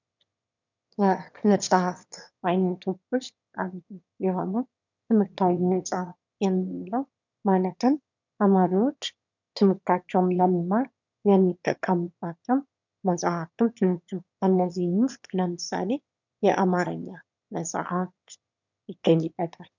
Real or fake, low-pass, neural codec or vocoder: fake; 7.2 kHz; autoencoder, 22.05 kHz, a latent of 192 numbers a frame, VITS, trained on one speaker